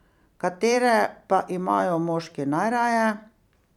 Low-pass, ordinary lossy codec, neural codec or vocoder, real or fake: 19.8 kHz; none; vocoder, 44.1 kHz, 128 mel bands every 256 samples, BigVGAN v2; fake